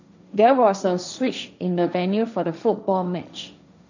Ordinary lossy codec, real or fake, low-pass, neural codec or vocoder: none; fake; 7.2 kHz; codec, 16 kHz, 1.1 kbps, Voila-Tokenizer